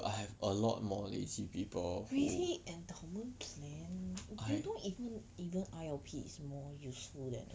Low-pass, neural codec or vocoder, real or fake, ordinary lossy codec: none; none; real; none